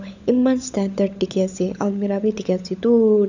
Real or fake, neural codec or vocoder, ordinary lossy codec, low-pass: fake; codec, 16 kHz, 16 kbps, FunCodec, trained on LibriTTS, 50 frames a second; none; 7.2 kHz